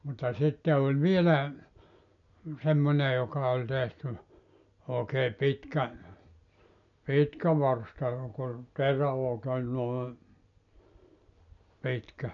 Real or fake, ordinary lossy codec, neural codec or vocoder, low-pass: real; none; none; 7.2 kHz